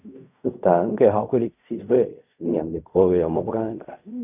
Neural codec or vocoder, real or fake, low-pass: codec, 16 kHz in and 24 kHz out, 0.4 kbps, LongCat-Audio-Codec, fine tuned four codebook decoder; fake; 3.6 kHz